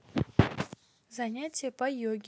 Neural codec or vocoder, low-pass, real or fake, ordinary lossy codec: none; none; real; none